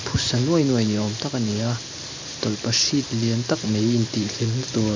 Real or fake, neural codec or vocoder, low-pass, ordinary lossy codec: fake; vocoder, 44.1 kHz, 128 mel bands every 512 samples, BigVGAN v2; 7.2 kHz; MP3, 64 kbps